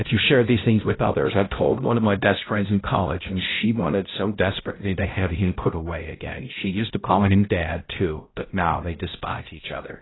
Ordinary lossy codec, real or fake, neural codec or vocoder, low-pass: AAC, 16 kbps; fake; codec, 16 kHz, 0.5 kbps, X-Codec, HuBERT features, trained on balanced general audio; 7.2 kHz